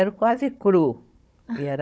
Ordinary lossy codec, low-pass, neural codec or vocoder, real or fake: none; none; codec, 16 kHz, 16 kbps, FunCodec, trained on Chinese and English, 50 frames a second; fake